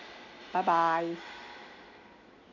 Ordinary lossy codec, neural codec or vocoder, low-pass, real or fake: none; none; 7.2 kHz; real